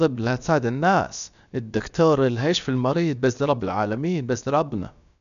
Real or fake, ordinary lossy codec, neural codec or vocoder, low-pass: fake; none; codec, 16 kHz, about 1 kbps, DyCAST, with the encoder's durations; 7.2 kHz